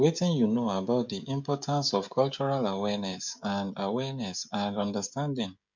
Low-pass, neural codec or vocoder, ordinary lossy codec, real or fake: 7.2 kHz; codec, 16 kHz, 16 kbps, FreqCodec, smaller model; MP3, 64 kbps; fake